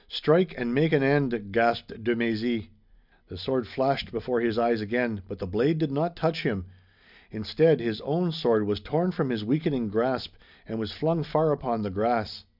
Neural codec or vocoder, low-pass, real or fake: none; 5.4 kHz; real